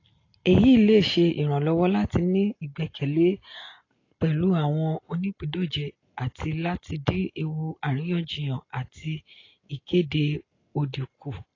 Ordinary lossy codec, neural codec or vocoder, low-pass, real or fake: AAC, 32 kbps; none; 7.2 kHz; real